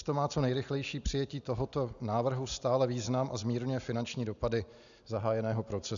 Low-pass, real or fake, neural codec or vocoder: 7.2 kHz; real; none